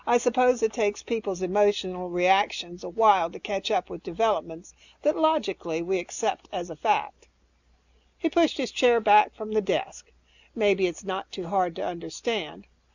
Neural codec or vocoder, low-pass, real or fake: none; 7.2 kHz; real